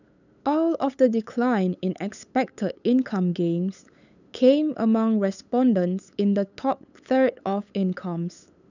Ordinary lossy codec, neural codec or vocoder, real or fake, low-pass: none; codec, 16 kHz, 8 kbps, FunCodec, trained on LibriTTS, 25 frames a second; fake; 7.2 kHz